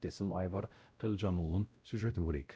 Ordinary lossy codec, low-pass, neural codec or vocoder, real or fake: none; none; codec, 16 kHz, 0.5 kbps, X-Codec, WavLM features, trained on Multilingual LibriSpeech; fake